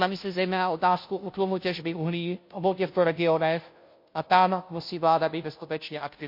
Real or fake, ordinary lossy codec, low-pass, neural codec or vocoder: fake; MP3, 32 kbps; 5.4 kHz; codec, 16 kHz, 0.5 kbps, FunCodec, trained on Chinese and English, 25 frames a second